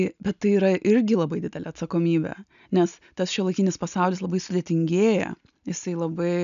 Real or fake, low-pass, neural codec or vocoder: real; 7.2 kHz; none